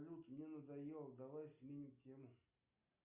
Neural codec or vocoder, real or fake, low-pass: none; real; 3.6 kHz